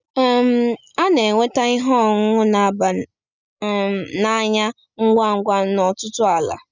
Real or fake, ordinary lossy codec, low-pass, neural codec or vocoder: real; none; 7.2 kHz; none